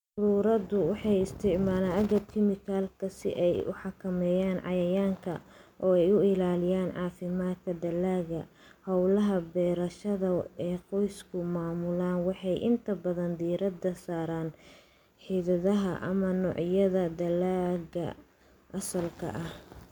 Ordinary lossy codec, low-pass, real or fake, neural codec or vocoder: MP3, 96 kbps; 19.8 kHz; real; none